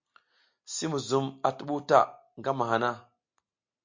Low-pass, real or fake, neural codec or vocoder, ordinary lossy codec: 7.2 kHz; real; none; MP3, 48 kbps